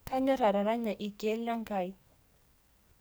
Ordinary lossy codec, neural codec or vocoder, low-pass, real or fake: none; codec, 44.1 kHz, 2.6 kbps, SNAC; none; fake